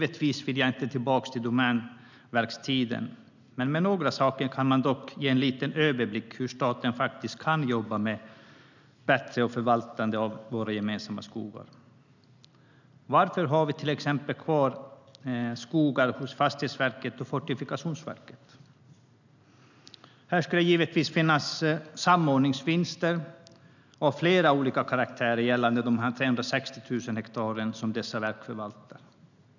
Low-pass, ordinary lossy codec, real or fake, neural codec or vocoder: 7.2 kHz; none; real; none